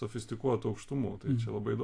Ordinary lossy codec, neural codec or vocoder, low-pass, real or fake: AAC, 48 kbps; none; 9.9 kHz; real